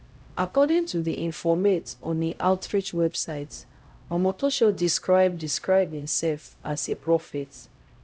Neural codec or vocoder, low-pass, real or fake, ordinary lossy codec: codec, 16 kHz, 0.5 kbps, X-Codec, HuBERT features, trained on LibriSpeech; none; fake; none